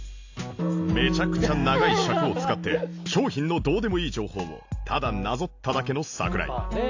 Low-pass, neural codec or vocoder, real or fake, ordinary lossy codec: 7.2 kHz; none; real; AAC, 48 kbps